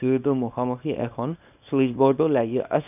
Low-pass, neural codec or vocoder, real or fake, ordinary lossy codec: 3.6 kHz; codec, 24 kHz, 0.9 kbps, WavTokenizer, small release; fake; none